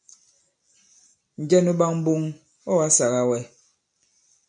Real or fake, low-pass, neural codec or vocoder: real; 9.9 kHz; none